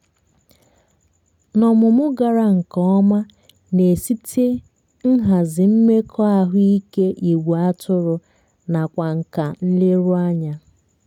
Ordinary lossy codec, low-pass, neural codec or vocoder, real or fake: none; 19.8 kHz; none; real